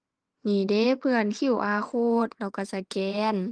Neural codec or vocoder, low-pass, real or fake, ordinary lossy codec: vocoder, 22.05 kHz, 80 mel bands, WaveNeXt; 9.9 kHz; fake; Opus, 24 kbps